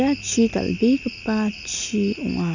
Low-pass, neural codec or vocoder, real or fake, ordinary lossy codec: 7.2 kHz; none; real; none